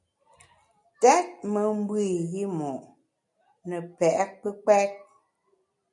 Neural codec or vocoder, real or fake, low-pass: none; real; 10.8 kHz